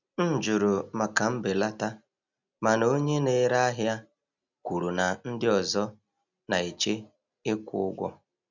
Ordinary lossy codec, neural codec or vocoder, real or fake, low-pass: none; none; real; 7.2 kHz